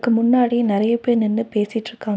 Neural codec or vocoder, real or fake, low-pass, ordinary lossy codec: none; real; none; none